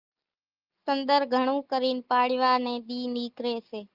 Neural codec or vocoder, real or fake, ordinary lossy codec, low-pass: none; real; Opus, 32 kbps; 5.4 kHz